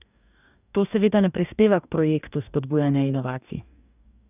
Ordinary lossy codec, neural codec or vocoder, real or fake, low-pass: none; codec, 44.1 kHz, 2.6 kbps, DAC; fake; 3.6 kHz